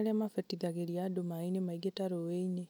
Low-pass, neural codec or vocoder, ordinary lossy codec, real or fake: none; none; none; real